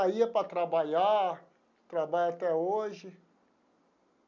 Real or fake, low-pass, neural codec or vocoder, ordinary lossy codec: real; 7.2 kHz; none; none